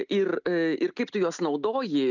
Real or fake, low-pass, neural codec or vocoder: real; 7.2 kHz; none